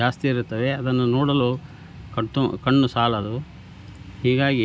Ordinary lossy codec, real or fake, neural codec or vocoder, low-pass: none; real; none; none